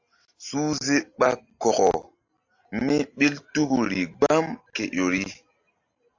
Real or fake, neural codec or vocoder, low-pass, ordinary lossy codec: real; none; 7.2 kHz; AAC, 48 kbps